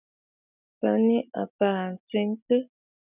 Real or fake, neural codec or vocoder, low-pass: real; none; 3.6 kHz